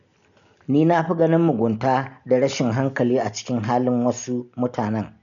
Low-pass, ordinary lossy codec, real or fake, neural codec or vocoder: 7.2 kHz; none; real; none